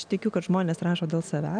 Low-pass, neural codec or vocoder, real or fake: 9.9 kHz; none; real